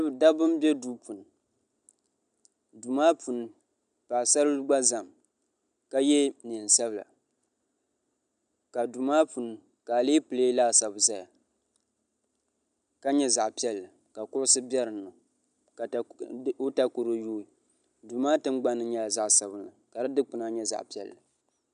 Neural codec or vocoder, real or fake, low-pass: none; real; 9.9 kHz